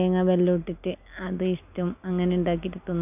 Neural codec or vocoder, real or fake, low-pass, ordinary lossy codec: none; real; 3.6 kHz; none